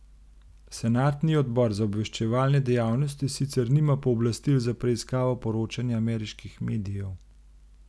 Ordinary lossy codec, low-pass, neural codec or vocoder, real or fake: none; none; none; real